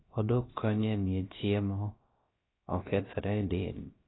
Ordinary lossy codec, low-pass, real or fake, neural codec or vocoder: AAC, 16 kbps; 7.2 kHz; fake; codec, 16 kHz, about 1 kbps, DyCAST, with the encoder's durations